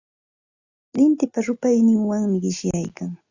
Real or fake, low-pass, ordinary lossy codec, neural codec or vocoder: real; 7.2 kHz; Opus, 64 kbps; none